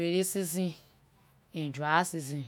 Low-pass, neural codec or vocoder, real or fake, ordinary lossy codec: none; autoencoder, 48 kHz, 128 numbers a frame, DAC-VAE, trained on Japanese speech; fake; none